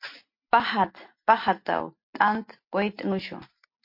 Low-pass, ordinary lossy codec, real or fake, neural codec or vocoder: 5.4 kHz; MP3, 32 kbps; real; none